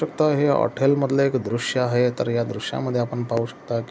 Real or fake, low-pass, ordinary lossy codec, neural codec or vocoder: real; none; none; none